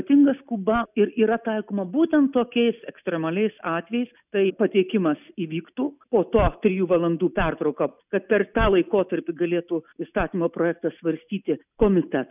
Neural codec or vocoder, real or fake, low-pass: none; real; 3.6 kHz